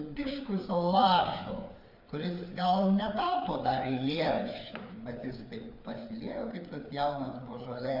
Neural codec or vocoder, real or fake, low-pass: codec, 16 kHz, 4 kbps, FreqCodec, larger model; fake; 5.4 kHz